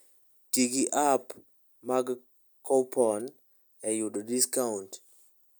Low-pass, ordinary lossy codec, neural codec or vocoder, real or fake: none; none; none; real